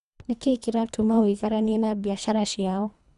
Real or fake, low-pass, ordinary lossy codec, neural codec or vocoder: fake; 10.8 kHz; none; codec, 24 kHz, 3 kbps, HILCodec